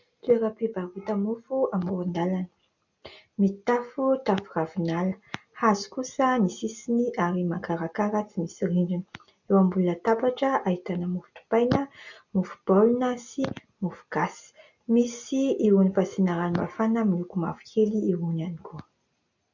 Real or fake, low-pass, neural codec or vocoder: real; 7.2 kHz; none